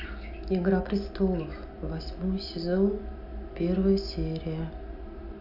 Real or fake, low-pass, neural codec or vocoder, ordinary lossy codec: real; 5.4 kHz; none; none